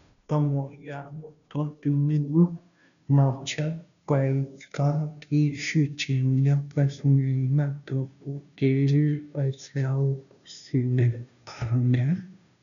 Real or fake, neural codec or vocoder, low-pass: fake; codec, 16 kHz, 0.5 kbps, FunCodec, trained on Chinese and English, 25 frames a second; 7.2 kHz